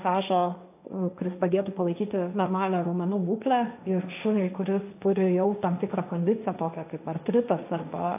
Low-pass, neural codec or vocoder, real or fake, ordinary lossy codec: 3.6 kHz; codec, 16 kHz, 1.1 kbps, Voila-Tokenizer; fake; AAC, 32 kbps